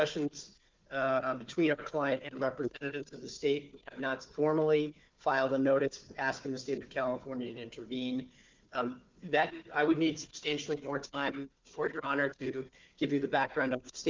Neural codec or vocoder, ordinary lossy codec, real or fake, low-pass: codec, 16 kHz, 4 kbps, FunCodec, trained on Chinese and English, 50 frames a second; Opus, 24 kbps; fake; 7.2 kHz